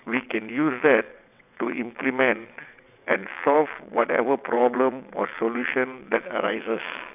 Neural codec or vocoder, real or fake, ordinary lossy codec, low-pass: vocoder, 22.05 kHz, 80 mel bands, WaveNeXt; fake; none; 3.6 kHz